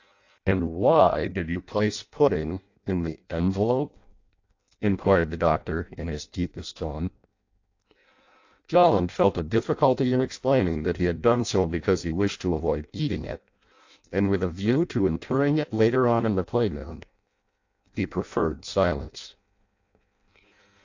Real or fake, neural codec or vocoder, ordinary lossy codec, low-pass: fake; codec, 16 kHz in and 24 kHz out, 0.6 kbps, FireRedTTS-2 codec; AAC, 48 kbps; 7.2 kHz